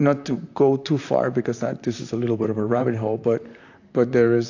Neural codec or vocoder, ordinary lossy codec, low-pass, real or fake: vocoder, 44.1 kHz, 128 mel bands, Pupu-Vocoder; AAC, 48 kbps; 7.2 kHz; fake